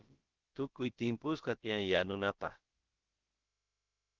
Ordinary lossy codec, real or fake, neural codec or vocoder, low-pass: Opus, 16 kbps; fake; codec, 16 kHz, about 1 kbps, DyCAST, with the encoder's durations; 7.2 kHz